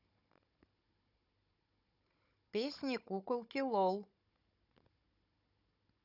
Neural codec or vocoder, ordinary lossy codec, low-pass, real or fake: codec, 16 kHz, 16 kbps, FunCodec, trained on Chinese and English, 50 frames a second; none; 5.4 kHz; fake